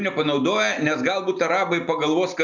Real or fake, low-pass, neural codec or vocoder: real; 7.2 kHz; none